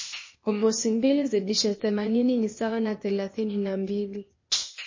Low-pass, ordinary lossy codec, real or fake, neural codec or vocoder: 7.2 kHz; MP3, 32 kbps; fake; codec, 16 kHz, 0.8 kbps, ZipCodec